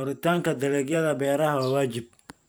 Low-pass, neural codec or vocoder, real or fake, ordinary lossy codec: none; vocoder, 44.1 kHz, 128 mel bands every 512 samples, BigVGAN v2; fake; none